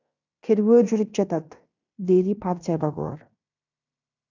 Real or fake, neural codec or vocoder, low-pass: fake; codec, 16 kHz in and 24 kHz out, 0.9 kbps, LongCat-Audio-Codec, fine tuned four codebook decoder; 7.2 kHz